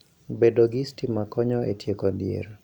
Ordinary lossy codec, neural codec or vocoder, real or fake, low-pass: none; none; real; 19.8 kHz